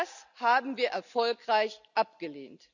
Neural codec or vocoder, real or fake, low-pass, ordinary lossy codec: none; real; 7.2 kHz; none